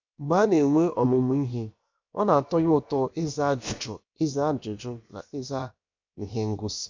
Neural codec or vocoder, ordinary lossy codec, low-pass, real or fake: codec, 16 kHz, about 1 kbps, DyCAST, with the encoder's durations; MP3, 64 kbps; 7.2 kHz; fake